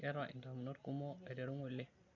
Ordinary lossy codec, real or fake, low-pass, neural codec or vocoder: none; real; 7.2 kHz; none